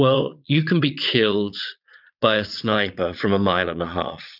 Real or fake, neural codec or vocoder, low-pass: fake; vocoder, 22.05 kHz, 80 mel bands, Vocos; 5.4 kHz